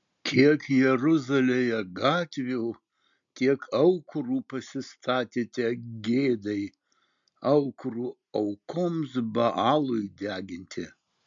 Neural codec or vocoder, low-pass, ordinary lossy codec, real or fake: none; 7.2 kHz; MP3, 64 kbps; real